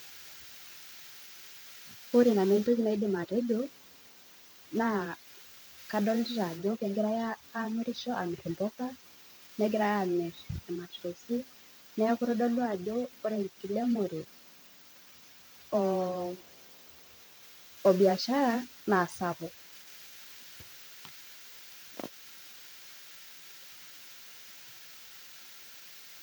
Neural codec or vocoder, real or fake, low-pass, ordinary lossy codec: vocoder, 44.1 kHz, 128 mel bands every 512 samples, BigVGAN v2; fake; none; none